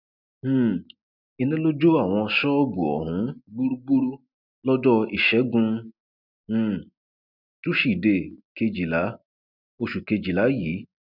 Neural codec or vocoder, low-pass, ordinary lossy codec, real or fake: none; 5.4 kHz; none; real